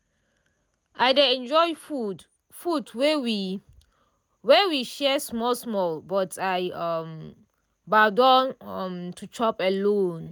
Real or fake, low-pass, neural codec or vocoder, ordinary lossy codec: real; none; none; none